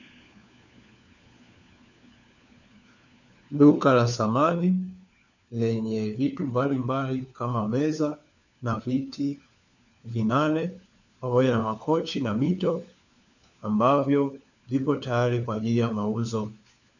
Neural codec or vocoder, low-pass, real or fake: codec, 16 kHz, 4 kbps, FunCodec, trained on LibriTTS, 50 frames a second; 7.2 kHz; fake